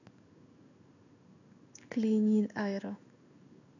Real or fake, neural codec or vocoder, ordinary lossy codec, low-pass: fake; codec, 16 kHz in and 24 kHz out, 1 kbps, XY-Tokenizer; none; 7.2 kHz